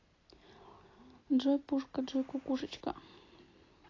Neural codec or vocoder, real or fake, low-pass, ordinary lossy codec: none; real; 7.2 kHz; AAC, 32 kbps